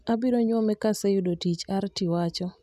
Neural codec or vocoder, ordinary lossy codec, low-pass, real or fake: none; none; 14.4 kHz; real